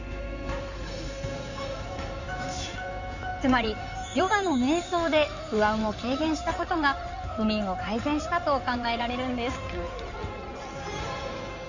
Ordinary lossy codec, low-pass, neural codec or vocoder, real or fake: none; 7.2 kHz; codec, 16 kHz in and 24 kHz out, 2.2 kbps, FireRedTTS-2 codec; fake